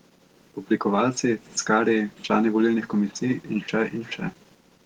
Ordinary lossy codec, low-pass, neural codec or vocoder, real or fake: Opus, 16 kbps; 19.8 kHz; none; real